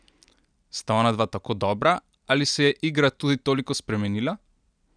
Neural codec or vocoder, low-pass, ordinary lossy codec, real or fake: none; 9.9 kHz; none; real